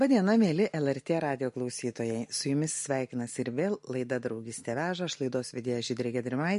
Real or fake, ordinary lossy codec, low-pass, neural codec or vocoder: real; MP3, 48 kbps; 14.4 kHz; none